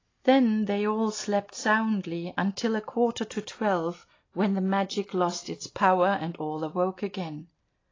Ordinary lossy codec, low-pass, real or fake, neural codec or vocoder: AAC, 32 kbps; 7.2 kHz; real; none